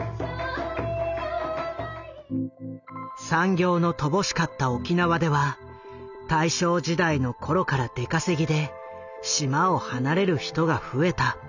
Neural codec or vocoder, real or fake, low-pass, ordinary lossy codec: none; real; 7.2 kHz; none